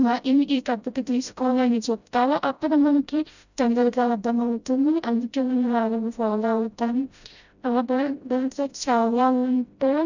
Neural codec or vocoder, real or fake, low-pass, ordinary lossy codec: codec, 16 kHz, 0.5 kbps, FreqCodec, smaller model; fake; 7.2 kHz; none